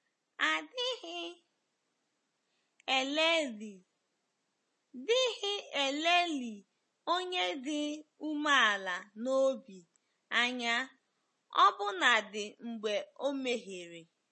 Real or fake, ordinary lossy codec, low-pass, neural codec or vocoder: real; MP3, 32 kbps; 10.8 kHz; none